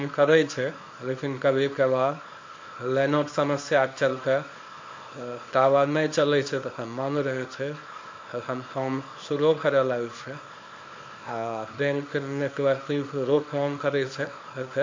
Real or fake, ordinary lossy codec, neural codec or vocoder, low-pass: fake; MP3, 48 kbps; codec, 24 kHz, 0.9 kbps, WavTokenizer, small release; 7.2 kHz